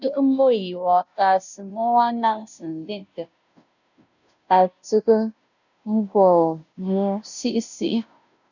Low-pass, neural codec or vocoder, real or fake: 7.2 kHz; codec, 16 kHz, 0.5 kbps, FunCodec, trained on Chinese and English, 25 frames a second; fake